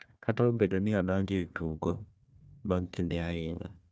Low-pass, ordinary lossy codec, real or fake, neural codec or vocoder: none; none; fake; codec, 16 kHz, 1 kbps, FunCodec, trained on Chinese and English, 50 frames a second